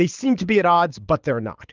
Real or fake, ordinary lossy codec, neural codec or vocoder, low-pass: fake; Opus, 32 kbps; codec, 24 kHz, 6 kbps, HILCodec; 7.2 kHz